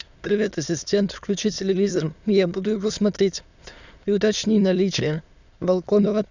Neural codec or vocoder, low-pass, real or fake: autoencoder, 22.05 kHz, a latent of 192 numbers a frame, VITS, trained on many speakers; 7.2 kHz; fake